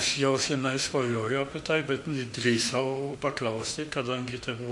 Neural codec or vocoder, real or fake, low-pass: autoencoder, 48 kHz, 32 numbers a frame, DAC-VAE, trained on Japanese speech; fake; 10.8 kHz